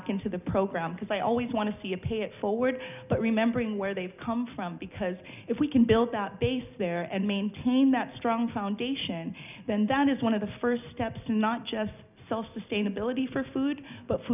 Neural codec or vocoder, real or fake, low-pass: none; real; 3.6 kHz